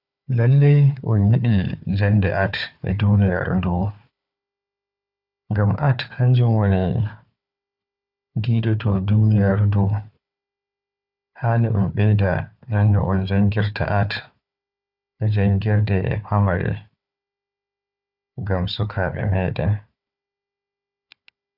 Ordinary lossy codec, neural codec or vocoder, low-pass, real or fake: none; codec, 16 kHz, 4 kbps, FunCodec, trained on Chinese and English, 50 frames a second; 5.4 kHz; fake